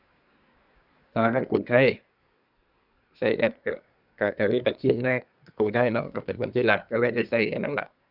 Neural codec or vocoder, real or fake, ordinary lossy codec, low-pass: codec, 24 kHz, 1 kbps, SNAC; fake; none; 5.4 kHz